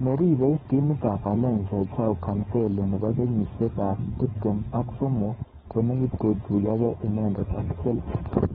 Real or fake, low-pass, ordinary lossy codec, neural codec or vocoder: fake; 7.2 kHz; AAC, 16 kbps; codec, 16 kHz, 4.8 kbps, FACodec